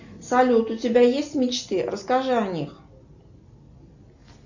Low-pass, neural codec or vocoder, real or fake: 7.2 kHz; none; real